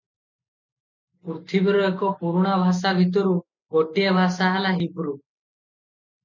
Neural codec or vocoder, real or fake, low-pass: none; real; 7.2 kHz